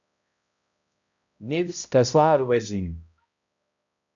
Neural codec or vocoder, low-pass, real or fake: codec, 16 kHz, 0.5 kbps, X-Codec, HuBERT features, trained on balanced general audio; 7.2 kHz; fake